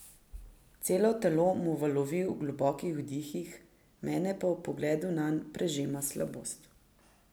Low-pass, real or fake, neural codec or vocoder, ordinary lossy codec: none; real; none; none